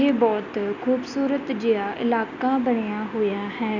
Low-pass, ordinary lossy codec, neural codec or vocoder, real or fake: 7.2 kHz; none; none; real